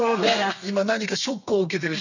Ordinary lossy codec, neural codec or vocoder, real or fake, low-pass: none; codec, 32 kHz, 1.9 kbps, SNAC; fake; 7.2 kHz